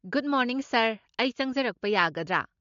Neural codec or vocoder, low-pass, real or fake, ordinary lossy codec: none; 7.2 kHz; real; MP3, 48 kbps